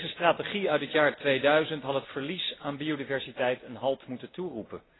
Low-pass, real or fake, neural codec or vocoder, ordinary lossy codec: 7.2 kHz; real; none; AAC, 16 kbps